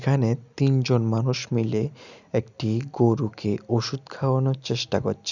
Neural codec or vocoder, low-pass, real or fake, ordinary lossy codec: none; 7.2 kHz; real; none